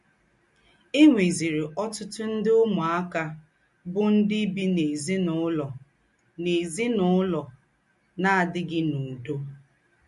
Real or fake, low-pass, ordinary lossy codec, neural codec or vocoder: real; 14.4 kHz; MP3, 48 kbps; none